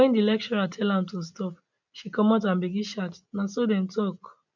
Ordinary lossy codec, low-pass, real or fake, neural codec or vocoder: none; 7.2 kHz; real; none